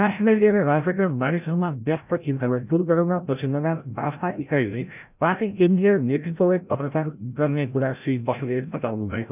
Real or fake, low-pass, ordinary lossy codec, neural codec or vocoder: fake; 3.6 kHz; none; codec, 16 kHz, 0.5 kbps, FreqCodec, larger model